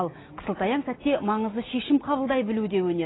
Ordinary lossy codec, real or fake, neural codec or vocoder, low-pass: AAC, 16 kbps; real; none; 7.2 kHz